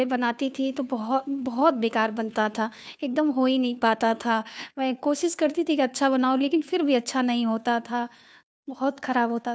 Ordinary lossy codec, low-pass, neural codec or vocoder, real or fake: none; none; codec, 16 kHz, 2 kbps, FunCodec, trained on Chinese and English, 25 frames a second; fake